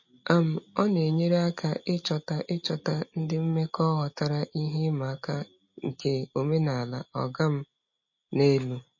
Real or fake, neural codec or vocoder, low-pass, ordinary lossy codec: real; none; 7.2 kHz; MP3, 32 kbps